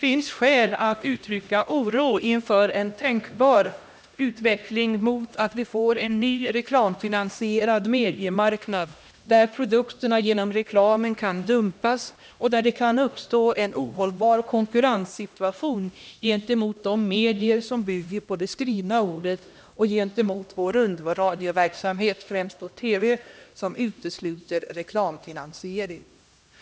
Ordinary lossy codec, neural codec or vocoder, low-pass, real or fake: none; codec, 16 kHz, 1 kbps, X-Codec, HuBERT features, trained on LibriSpeech; none; fake